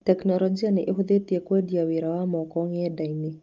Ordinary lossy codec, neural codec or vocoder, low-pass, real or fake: Opus, 32 kbps; none; 7.2 kHz; real